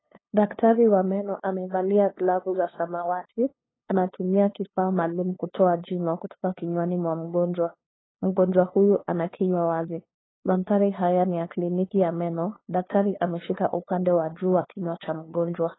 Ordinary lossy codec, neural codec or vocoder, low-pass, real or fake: AAC, 16 kbps; codec, 16 kHz, 2 kbps, FunCodec, trained on LibriTTS, 25 frames a second; 7.2 kHz; fake